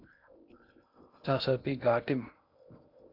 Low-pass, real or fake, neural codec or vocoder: 5.4 kHz; fake; codec, 16 kHz in and 24 kHz out, 0.6 kbps, FocalCodec, streaming, 2048 codes